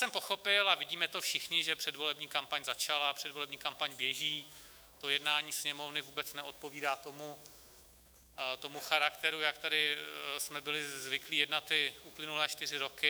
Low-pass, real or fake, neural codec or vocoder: 19.8 kHz; fake; autoencoder, 48 kHz, 128 numbers a frame, DAC-VAE, trained on Japanese speech